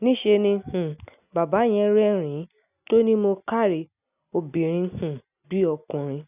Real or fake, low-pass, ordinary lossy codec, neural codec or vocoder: real; 3.6 kHz; none; none